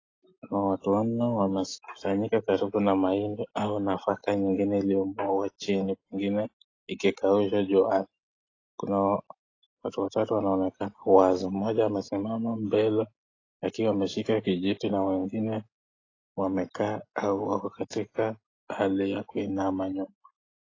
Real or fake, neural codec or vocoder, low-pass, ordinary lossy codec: real; none; 7.2 kHz; AAC, 32 kbps